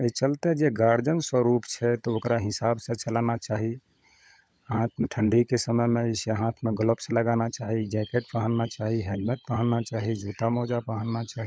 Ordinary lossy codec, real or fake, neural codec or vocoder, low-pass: none; fake; codec, 16 kHz, 16 kbps, FunCodec, trained on LibriTTS, 50 frames a second; none